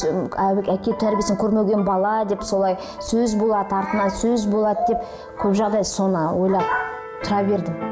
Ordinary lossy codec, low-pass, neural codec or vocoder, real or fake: none; none; none; real